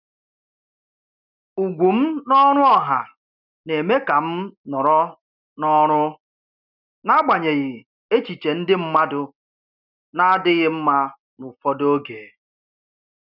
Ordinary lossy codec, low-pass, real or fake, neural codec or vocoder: none; 5.4 kHz; real; none